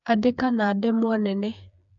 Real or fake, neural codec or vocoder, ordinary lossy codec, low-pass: fake; codec, 16 kHz, 4 kbps, FreqCodec, smaller model; AAC, 64 kbps; 7.2 kHz